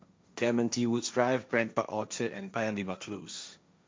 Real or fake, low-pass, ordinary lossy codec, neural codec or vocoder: fake; none; none; codec, 16 kHz, 1.1 kbps, Voila-Tokenizer